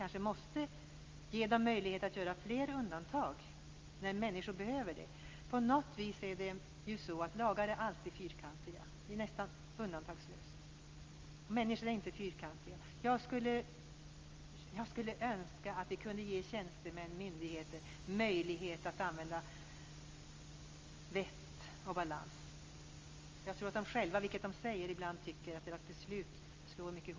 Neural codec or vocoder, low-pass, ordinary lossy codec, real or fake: none; 7.2 kHz; Opus, 32 kbps; real